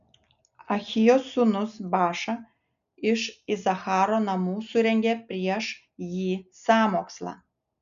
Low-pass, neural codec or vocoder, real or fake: 7.2 kHz; none; real